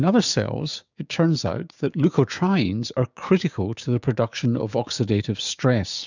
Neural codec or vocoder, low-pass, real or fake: codec, 16 kHz, 6 kbps, DAC; 7.2 kHz; fake